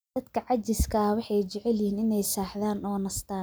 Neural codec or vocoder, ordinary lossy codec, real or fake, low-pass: none; none; real; none